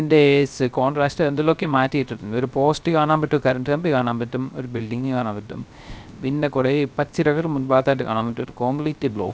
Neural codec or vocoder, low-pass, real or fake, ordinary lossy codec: codec, 16 kHz, 0.3 kbps, FocalCodec; none; fake; none